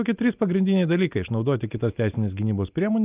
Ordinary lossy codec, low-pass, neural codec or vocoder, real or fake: Opus, 24 kbps; 3.6 kHz; none; real